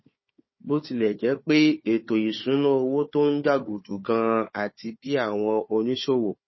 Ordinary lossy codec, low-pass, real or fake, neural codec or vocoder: MP3, 24 kbps; 7.2 kHz; fake; codec, 16 kHz, 4 kbps, FunCodec, trained on Chinese and English, 50 frames a second